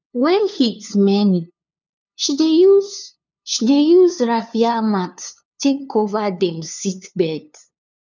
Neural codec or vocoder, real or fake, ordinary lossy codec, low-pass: codec, 16 kHz, 2 kbps, FunCodec, trained on LibriTTS, 25 frames a second; fake; none; 7.2 kHz